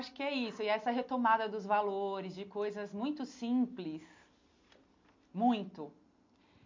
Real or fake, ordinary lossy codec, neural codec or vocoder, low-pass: real; MP3, 48 kbps; none; 7.2 kHz